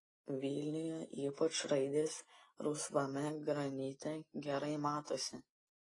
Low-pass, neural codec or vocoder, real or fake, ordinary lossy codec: 10.8 kHz; none; real; AAC, 32 kbps